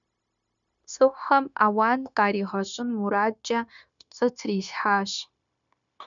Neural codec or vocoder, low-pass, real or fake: codec, 16 kHz, 0.9 kbps, LongCat-Audio-Codec; 7.2 kHz; fake